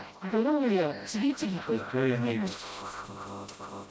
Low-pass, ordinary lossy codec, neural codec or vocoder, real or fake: none; none; codec, 16 kHz, 0.5 kbps, FreqCodec, smaller model; fake